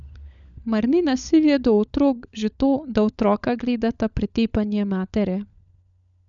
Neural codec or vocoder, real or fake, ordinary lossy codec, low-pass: codec, 16 kHz, 16 kbps, FunCodec, trained on LibriTTS, 50 frames a second; fake; none; 7.2 kHz